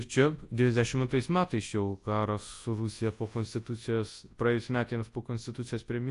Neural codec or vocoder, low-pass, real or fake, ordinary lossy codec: codec, 24 kHz, 0.9 kbps, WavTokenizer, large speech release; 10.8 kHz; fake; AAC, 48 kbps